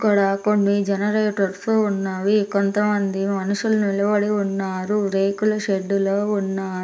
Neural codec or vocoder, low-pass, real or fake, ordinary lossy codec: none; none; real; none